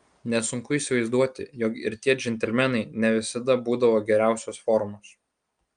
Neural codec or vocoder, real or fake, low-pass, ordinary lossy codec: none; real; 9.9 kHz; Opus, 32 kbps